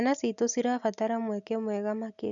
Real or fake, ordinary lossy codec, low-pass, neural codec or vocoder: real; none; 7.2 kHz; none